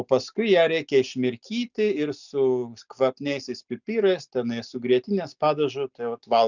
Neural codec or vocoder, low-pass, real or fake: none; 7.2 kHz; real